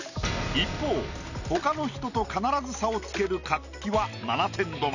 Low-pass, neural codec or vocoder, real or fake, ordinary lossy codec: 7.2 kHz; none; real; none